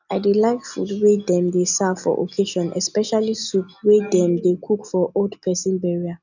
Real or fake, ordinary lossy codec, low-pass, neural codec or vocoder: real; none; 7.2 kHz; none